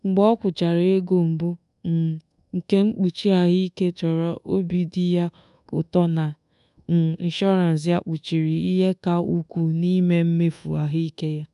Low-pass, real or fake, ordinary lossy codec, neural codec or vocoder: 10.8 kHz; fake; none; codec, 24 kHz, 1.2 kbps, DualCodec